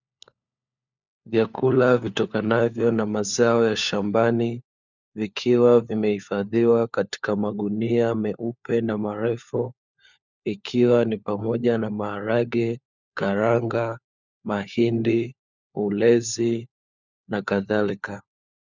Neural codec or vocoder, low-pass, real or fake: codec, 16 kHz, 4 kbps, FunCodec, trained on LibriTTS, 50 frames a second; 7.2 kHz; fake